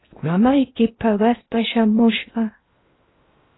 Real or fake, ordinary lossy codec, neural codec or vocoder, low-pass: fake; AAC, 16 kbps; codec, 16 kHz in and 24 kHz out, 0.6 kbps, FocalCodec, streaming, 4096 codes; 7.2 kHz